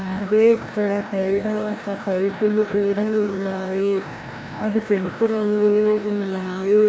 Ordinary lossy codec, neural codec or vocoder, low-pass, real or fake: none; codec, 16 kHz, 1 kbps, FreqCodec, larger model; none; fake